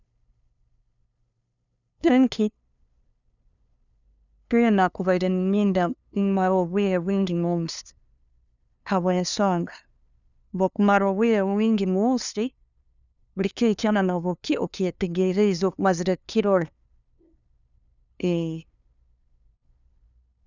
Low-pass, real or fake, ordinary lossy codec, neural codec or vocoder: 7.2 kHz; fake; none; codec, 16 kHz, 2 kbps, FunCodec, trained on LibriTTS, 25 frames a second